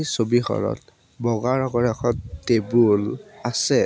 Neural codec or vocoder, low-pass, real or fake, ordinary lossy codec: none; none; real; none